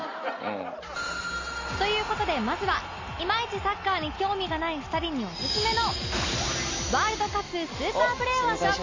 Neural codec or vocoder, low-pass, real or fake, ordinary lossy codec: none; 7.2 kHz; real; AAC, 32 kbps